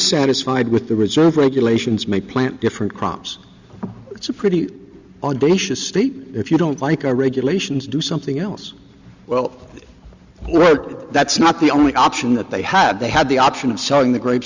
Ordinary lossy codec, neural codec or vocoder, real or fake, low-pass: Opus, 64 kbps; none; real; 7.2 kHz